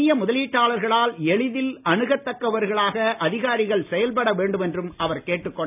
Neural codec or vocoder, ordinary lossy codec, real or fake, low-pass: none; none; real; 3.6 kHz